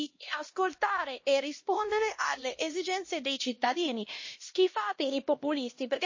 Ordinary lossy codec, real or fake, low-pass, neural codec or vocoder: MP3, 32 kbps; fake; 7.2 kHz; codec, 16 kHz, 1 kbps, X-Codec, HuBERT features, trained on LibriSpeech